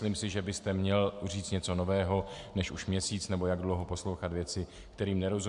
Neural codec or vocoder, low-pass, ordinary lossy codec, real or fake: none; 10.8 kHz; MP3, 64 kbps; real